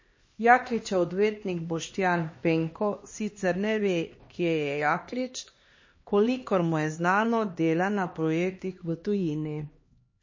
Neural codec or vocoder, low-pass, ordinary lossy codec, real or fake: codec, 16 kHz, 2 kbps, X-Codec, HuBERT features, trained on LibriSpeech; 7.2 kHz; MP3, 32 kbps; fake